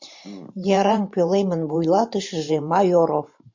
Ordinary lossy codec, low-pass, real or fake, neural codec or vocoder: MP3, 48 kbps; 7.2 kHz; fake; vocoder, 44.1 kHz, 128 mel bands every 512 samples, BigVGAN v2